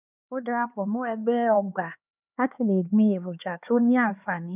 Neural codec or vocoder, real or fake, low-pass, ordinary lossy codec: codec, 16 kHz, 4 kbps, X-Codec, HuBERT features, trained on LibriSpeech; fake; 3.6 kHz; none